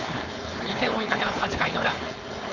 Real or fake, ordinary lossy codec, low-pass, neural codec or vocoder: fake; none; 7.2 kHz; codec, 16 kHz, 4.8 kbps, FACodec